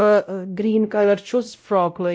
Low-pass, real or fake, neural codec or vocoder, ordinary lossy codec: none; fake; codec, 16 kHz, 0.5 kbps, X-Codec, WavLM features, trained on Multilingual LibriSpeech; none